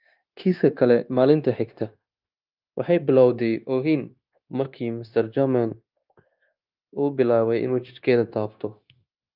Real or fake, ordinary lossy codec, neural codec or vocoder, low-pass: fake; Opus, 24 kbps; codec, 16 kHz, 0.9 kbps, LongCat-Audio-Codec; 5.4 kHz